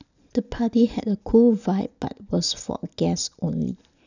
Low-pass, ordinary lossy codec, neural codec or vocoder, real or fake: 7.2 kHz; none; none; real